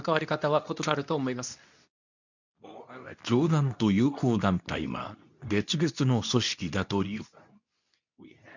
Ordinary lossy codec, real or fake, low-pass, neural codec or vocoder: none; fake; 7.2 kHz; codec, 24 kHz, 0.9 kbps, WavTokenizer, medium speech release version 2